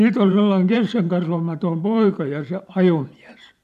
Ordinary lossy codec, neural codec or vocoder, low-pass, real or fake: none; vocoder, 44.1 kHz, 128 mel bands every 512 samples, BigVGAN v2; 14.4 kHz; fake